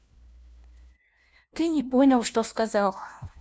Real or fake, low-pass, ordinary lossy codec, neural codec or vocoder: fake; none; none; codec, 16 kHz, 1 kbps, FunCodec, trained on LibriTTS, 50 frames a second